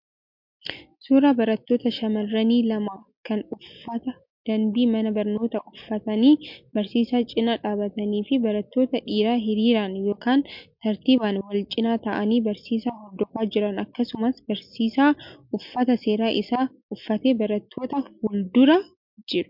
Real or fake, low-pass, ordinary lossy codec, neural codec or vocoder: real; 5.4 kHz; MP3, 48 kbps; none